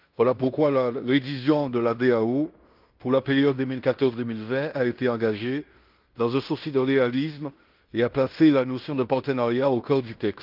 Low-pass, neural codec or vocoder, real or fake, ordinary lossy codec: 5.4 kHz; codec, 16 kHz in and 24 kHz out, 0.9 kbps, LongCat-Audio-Codec, fine tuned four codebook decoder; fake; Opus, 32 kbps